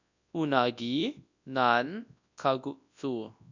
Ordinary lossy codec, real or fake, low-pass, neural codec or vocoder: none; fake; 7.2 kHz; codec, 24 kHz, 0.9 kbps, WavTokenizer, large speech release